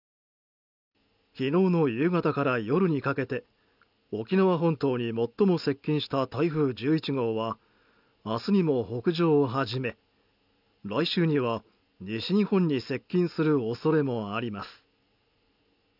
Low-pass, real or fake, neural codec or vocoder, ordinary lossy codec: 5.4 kHz; real; none; none